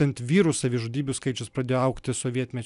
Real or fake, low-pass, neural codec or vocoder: real; 10.8 kHz; none